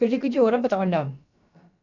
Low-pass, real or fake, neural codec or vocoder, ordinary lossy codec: 7.2 kHz; fake; codec, 16 kHz, about 1 kbps, DyCAST, with the encoder's durations; none